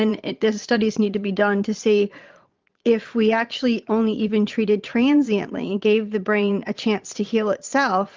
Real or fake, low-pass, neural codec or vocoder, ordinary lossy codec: fake; 7.2 kHz; vocoder, 22.05 kHz, 80 mel bands, Vocos; Opus, 24 kbps